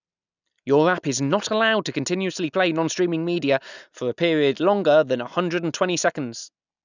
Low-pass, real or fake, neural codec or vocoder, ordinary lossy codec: 7.2 kHz; real; none; none